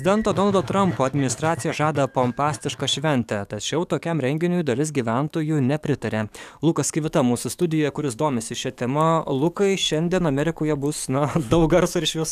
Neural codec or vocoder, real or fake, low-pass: codec, 44.1 kHz, 7.8 kbps, DAC; fake; 14.4 kHz